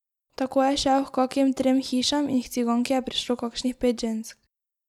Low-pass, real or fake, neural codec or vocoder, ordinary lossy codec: 19.8 kHz; real; none; none